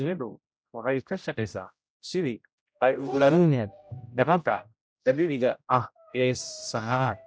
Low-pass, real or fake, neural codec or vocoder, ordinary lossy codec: none; fake; codec, 16 kHz, 0.5 kbps, X-Codec, HuBERT features, trained on general audio; none